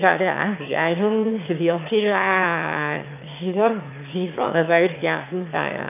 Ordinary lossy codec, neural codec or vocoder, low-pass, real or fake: none; autoencoder, 22.05 kHz, a latent of 192 numbers a frame, VITS, trained on one speaker; 3.6 kHz; fake